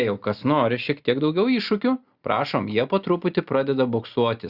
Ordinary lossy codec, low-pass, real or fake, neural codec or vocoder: Opus, 64 kbps; 5.4 kHz; real; none